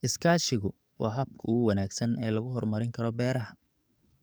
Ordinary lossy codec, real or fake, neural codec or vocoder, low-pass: none; fake; codec, 44.1 kHz, 7.8 kbps, DAC; none